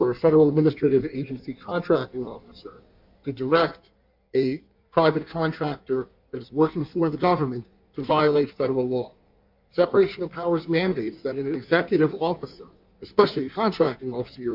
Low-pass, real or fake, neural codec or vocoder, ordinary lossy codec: 5.4 kHz; fake; codec, 16 kHz in and 24 kHz out, 1.1 kbps, FireRedTTS-2 codec; MP3, 48 kbps